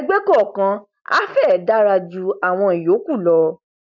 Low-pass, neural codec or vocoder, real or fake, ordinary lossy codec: 7.2 kHz; none; real; none